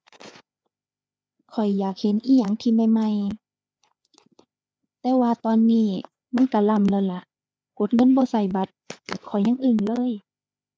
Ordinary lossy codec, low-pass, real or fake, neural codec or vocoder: none; none; fake; codec, 16 kHz, 4 kbps, FreqCodec, larger model